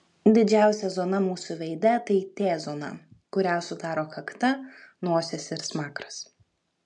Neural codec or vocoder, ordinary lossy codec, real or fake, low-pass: none; MP3, 64 kbps; real; 10.8 kHz